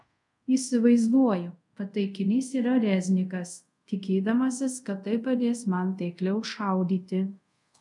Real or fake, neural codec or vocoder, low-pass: fake; codec, 24 kHz, 0.5 kbps, DualCodec; 10.8 kHz